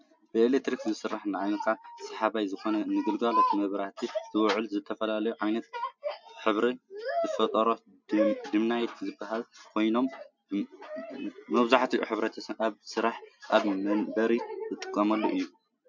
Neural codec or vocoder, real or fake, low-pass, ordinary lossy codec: none; real; 7.2 kHz; MP3, 64 kbps